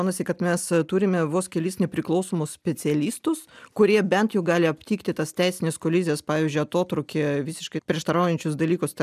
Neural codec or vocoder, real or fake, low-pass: none; real; 14.4 kHz